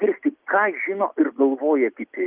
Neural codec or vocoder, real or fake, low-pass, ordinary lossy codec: none; real; 3.6 kHz; Opus, 32 kbps